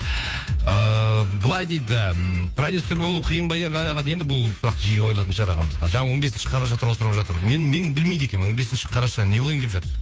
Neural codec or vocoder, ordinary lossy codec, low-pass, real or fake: codec, 16 kHz, 2 kbps, FunCodec, trained on Chinese and English, 25 frames a second; none; none; fake